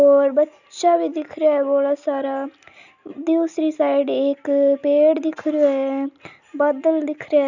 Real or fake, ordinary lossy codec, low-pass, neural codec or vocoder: real; none; 7.2 kHz; none